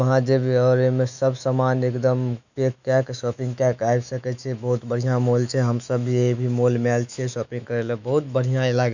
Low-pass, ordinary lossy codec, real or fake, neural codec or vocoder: 7.2 kHz; none; fake; autoencoder, 48 kHz, 128 numbers a frame, DAC-VAE, trained on Japanese speech